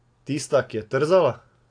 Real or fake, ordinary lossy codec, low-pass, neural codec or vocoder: real; AAC, 48 kbps; 9.9 kHz; none